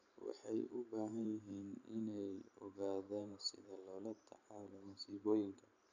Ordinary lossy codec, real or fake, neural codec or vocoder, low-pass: none; fake; vocoder, 44.1 kHz, 128 mel bands every 512 samples, BigVGAN v2; 7.2 kHz